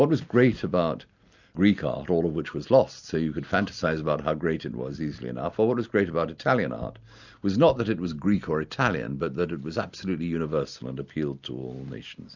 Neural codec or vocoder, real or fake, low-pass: none; real; 7.2 kHz